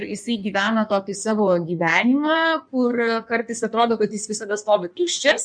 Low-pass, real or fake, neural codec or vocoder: 9.9 kHz; fake; codec, 16 kHz in and 24 kHz out, 1.1 kbps, FireRedTTS-2 codec